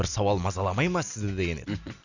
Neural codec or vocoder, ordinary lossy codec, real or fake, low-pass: none; none; real; 7.2 kHz